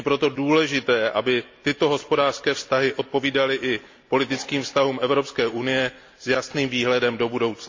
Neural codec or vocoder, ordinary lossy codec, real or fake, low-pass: none; MP3, 48 kbps; real; 7.2 kHz